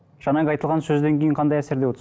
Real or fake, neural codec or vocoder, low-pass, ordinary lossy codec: real; none; none; none